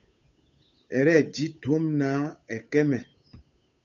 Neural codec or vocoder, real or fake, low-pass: codec, 16 kHz, 8 kbps, FunCodec, trained on Chinese and English, 25 frames a second; fake; 7.2 kHz